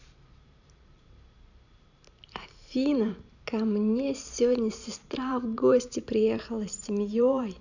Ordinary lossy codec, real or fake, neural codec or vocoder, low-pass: none; fake; vocoder, 44.1 kHz, 128 mel bands every 512 samples, BigVGAN v2; 7.2 kHz